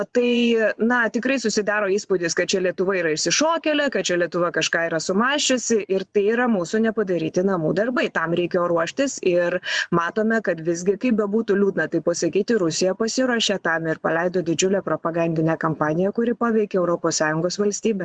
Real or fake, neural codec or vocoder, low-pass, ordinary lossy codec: fake; vocoder, 24 kHz, 100 mel bands, Vocos; 9.9 kHz; Opus, 64 kbps